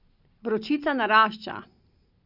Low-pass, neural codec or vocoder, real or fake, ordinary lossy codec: 5.4 kHz; none; real; none